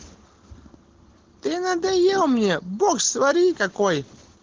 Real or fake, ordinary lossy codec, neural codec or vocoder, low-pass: real; Opus, 16 kbps; none; 7.2 kHz